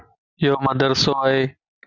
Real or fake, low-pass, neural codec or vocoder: real; 7.2 kHz; none